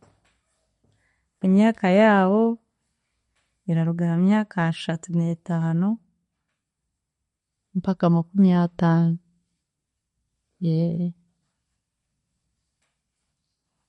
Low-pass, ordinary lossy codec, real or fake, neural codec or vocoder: 19.8 kHz; MP3, 48 kbps; real; none